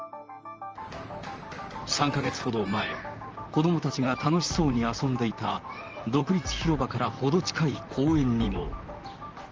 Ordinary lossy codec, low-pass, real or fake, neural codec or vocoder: Opus, 24 kbps; 7.2 kHz; fake; vocoder, 44.1 kHz, 128 mel bands, Pupu-Vocoder